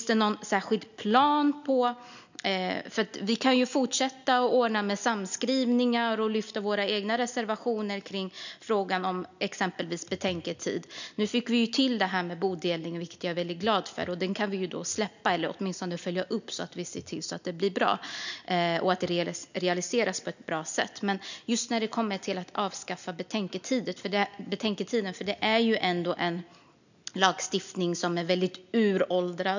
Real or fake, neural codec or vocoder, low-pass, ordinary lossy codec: real; none; 7.2 kHz; none